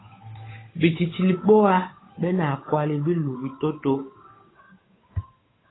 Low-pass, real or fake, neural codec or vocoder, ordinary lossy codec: 7.2 kHz; fake; codec, 16 kHz, 8 kbps, FunCodec, trained on Chinese and English, 25 frames a second; AAC, 16 kbps